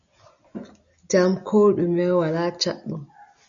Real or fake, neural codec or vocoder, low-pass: real; none; 7.2 kHz